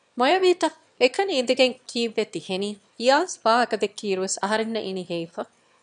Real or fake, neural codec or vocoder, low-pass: fake; autoencoder, 22.05 kHz, a latent of 192 numbers a frame, VITS, trained on one speaker; 9.9 kHz